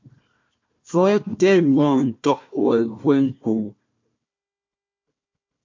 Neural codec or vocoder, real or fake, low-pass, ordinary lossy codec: codec, 16 kHz, 1 kbps, FunCodec, trained on Chinese and English, 50 frames a second; fake; 7.2 kHz; MP3, 48 kbps